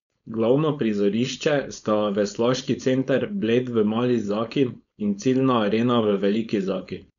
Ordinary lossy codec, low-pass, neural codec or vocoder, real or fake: none; 7.2 kHz; codec, 16 kHz, 4.8 kbps, FACodec; fake